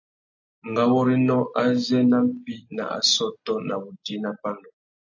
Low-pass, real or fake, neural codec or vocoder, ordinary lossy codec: 7.2 kHz; real; none; AAC, 48 kbps